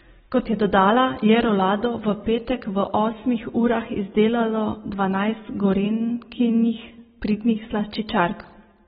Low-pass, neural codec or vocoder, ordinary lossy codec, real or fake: 19.8 kHz; none; AAC, 16 kbps; real